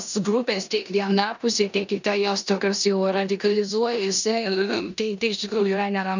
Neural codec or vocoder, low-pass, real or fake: codec, 16 kHz in and 24 kHz out, 0.9 kbps, LongCat-Audio-Codec, four codebook decoder; 7.2 kHz; fake